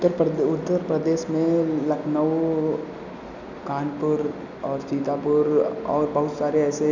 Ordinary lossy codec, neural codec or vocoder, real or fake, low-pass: none; none; real; 7.2 kHz